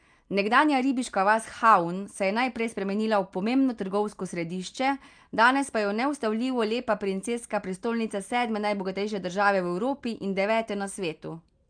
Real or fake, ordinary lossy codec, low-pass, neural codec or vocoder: real; Opus, 32 kbps; 9.9 kHz; none